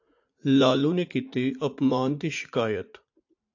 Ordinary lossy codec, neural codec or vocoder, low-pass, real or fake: AAC, 48 kbps; vocoder, 44.1 kHz, 80 mel bands, Vocos; 7.2 kHz; fake